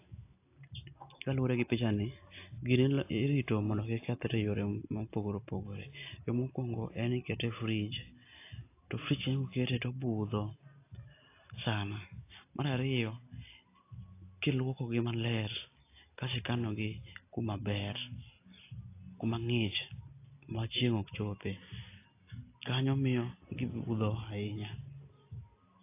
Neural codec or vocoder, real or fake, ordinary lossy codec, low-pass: none; real; AAC, 24 kbps; 3.6 kHz